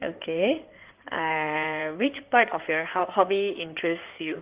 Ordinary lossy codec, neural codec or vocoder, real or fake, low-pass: Opus, 32 kbps; codec, 16 kHz in and 24 kHz out, 2.2 kbps, FireRedTTS-2 codec; fake; 3.6 kHz